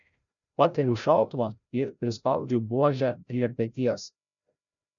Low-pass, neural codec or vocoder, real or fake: 7.2 kHz; codec, 16 kHz, 0.5 kbps, FreqCodec, larger model; fake